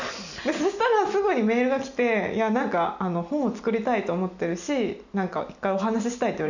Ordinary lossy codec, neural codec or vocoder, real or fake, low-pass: none; none; real; 7.2 kHz